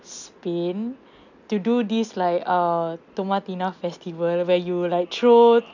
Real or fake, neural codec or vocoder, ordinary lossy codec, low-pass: real; none; none; 7.2 kHz